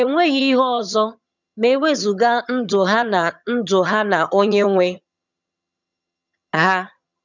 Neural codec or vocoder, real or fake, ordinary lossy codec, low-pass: vocoder, 22.05 kHz, 80 mel bands, HiFi-GAN; fake; none; 7.2 kHz